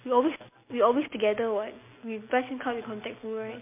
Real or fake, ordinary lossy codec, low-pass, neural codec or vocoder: real; MP3, 24 kbps; 3.6 kHz; none